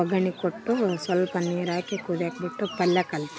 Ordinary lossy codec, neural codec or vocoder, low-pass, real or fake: none; none; none; real